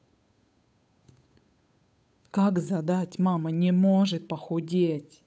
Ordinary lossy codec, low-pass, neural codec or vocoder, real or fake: none; none; codec, 16 kHz, 8 kbps, FunCodec, trained on Chinese and English, 25 frames a second; fake